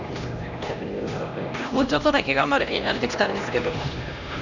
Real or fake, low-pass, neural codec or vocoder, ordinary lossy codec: fake; 7.2 kHz; codec, 16 kHz, 1 kbps, X-Codec, HuBERT features, trained on LibriSpeech; none